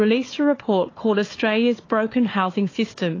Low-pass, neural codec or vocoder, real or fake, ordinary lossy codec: 7.2 kHz; codec, 16 kHz, 4 kbps, FunCodec, trained on Chinese and English, 50 frames a second; fake; AAC, 32 kbps